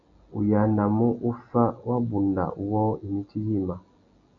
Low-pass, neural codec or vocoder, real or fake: 7.2 kHz; none; real